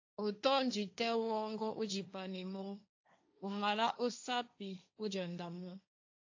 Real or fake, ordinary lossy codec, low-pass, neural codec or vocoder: fake; AAC, 48 kbps; 7.2 kHz; codec, 16 kHz, 1.1 kbps, Voila-Tokenizer